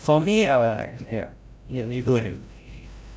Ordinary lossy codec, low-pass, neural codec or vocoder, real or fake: none; none; codec, 16 kHz, 0.5 kbps, FreqCodec, larger model; fake